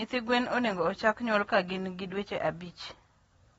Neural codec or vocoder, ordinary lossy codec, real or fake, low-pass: none; AAC, 24 kbps; real; 19.8 kHz